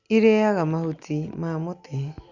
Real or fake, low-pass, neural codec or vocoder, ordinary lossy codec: real; 7.2 kHz; none; Opus, 64 kbps